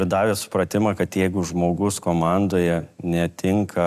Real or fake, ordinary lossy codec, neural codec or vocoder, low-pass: real; AAC, 96 kbps; none; 14.4 kHz